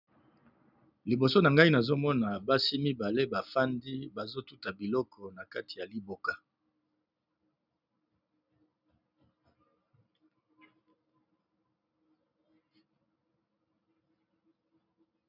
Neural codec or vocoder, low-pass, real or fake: none; 5.4 kHz; real